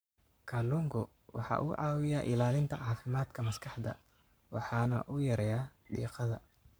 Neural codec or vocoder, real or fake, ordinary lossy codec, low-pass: codec, 44.1 kHz, 7.8 kbps, Pupu-Codec; fake; none; none